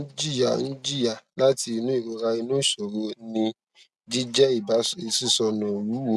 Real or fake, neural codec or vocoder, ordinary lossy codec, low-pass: real; none; none; none